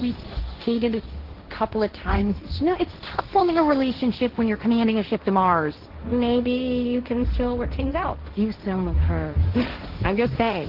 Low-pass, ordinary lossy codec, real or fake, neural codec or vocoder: 5.4 kHz; Opus, 16 kbps; fake; codec, 16 kHz, 1.1 kbps, Voila-Tokenizer